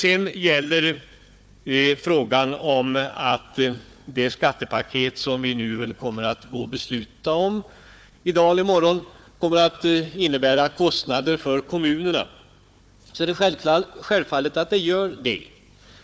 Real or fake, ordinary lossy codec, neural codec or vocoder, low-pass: fake; none; codec, 16 kHz, 4 kbps, FunCodec, trained on Chinese and English, 50 frames a second; none